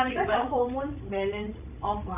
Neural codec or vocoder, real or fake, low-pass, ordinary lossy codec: codec, 16 kHz, 16 kbps, FreqCodec, larger model; fake; 3.6 kHz; none